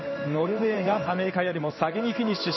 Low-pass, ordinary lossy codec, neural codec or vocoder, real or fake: 7.2 kHz; MP3, 24 kbps; codec, 16 kHz in and 24 kHz out, 1 kbps, XY-Tokenizer; fake